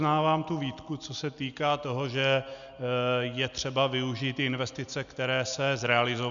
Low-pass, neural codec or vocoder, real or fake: 7.2 kHz; none; real